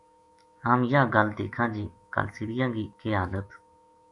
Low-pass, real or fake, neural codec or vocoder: 10.8 kHz; fake; autoencoder, 48 kHz, 128 numbers a frame, DAC-VAE, trained on Japanese speech